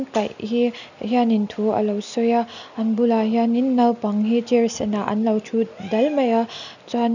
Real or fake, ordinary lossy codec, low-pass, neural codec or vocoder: real; none; 7.2 kHz; none